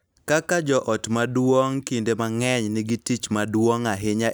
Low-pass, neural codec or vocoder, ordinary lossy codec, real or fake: none; none; none; real